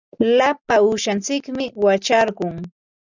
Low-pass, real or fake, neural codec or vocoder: 7.2 kHz; real; none